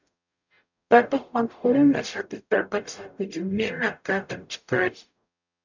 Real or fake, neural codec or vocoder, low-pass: fake; codec, 44.1 kHz, 0.9 kbps, DAC; 7.2 kHz